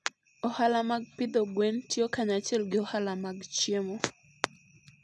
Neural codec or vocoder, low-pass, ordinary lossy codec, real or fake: none; none; none; real